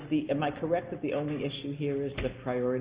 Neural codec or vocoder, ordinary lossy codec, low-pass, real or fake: none; Opus, 64 kbps; 3.6 kHz; real